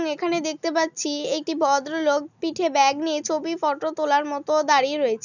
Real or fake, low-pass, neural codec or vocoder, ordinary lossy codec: real; 7.2 kHz; none; none